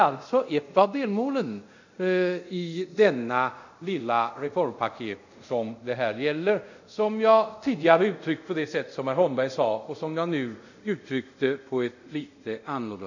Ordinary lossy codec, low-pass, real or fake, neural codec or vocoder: none; 7.2 kHz; fake; codec, 24 kHz, 0.5 kbps, DualCodec